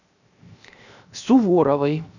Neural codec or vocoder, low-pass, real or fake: codec, 16 kHz, 0.7 kbps, FocalCodec; 7.2 kHz; fake